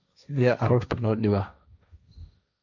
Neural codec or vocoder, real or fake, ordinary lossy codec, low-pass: codec, 16 kHz, 1.1 kbps, Voila-Tokenizer; fake; none; 7.2 kHz